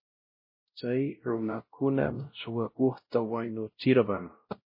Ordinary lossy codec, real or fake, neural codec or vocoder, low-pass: MP3, 24 kbps; fake; codec, 16 kHz, 0.5 kbps, X-Codec, WavLM features, trained on Multilingual LibriSpeech; 5.4 kHz